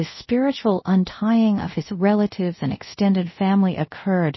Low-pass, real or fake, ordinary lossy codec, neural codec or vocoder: 7.2 kHz; fake; MP3, 24 kbps; codec, 24 kHz, 0.5 kbps, DualCodec